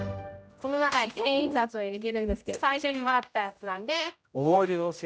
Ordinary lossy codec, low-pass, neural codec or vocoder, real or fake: none; none; codec, 16 kHz, 0.5 kbps, X-Codec, HuBERT features, trained on general audio; fake